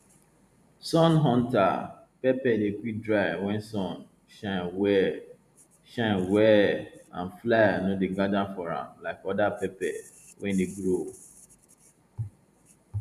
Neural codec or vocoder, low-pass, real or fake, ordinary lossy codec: vocoder, 44.1 kHz, 128 mel bands every 512 samples, BigVGAN v2; 14.4 kHz; fake; none